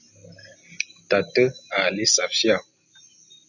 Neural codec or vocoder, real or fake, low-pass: none; real; 7.2 kHz